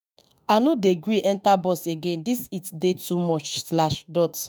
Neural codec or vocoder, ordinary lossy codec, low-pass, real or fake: autoencoder, 48 kHz, 32 numbers a frame, DAC-VAE, trained on Japanese speech; none; none; fake